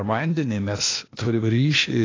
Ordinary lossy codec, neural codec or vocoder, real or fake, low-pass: AAC, 32 kbps; codec, 16 kHz, 0.8 kbps, ZipCodec; fake; 7.2 kHz